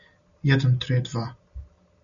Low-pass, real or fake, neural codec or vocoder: 7.2 kHz; real; none